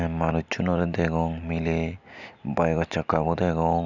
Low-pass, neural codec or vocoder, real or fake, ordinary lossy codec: 7.2 kHz; none; real; none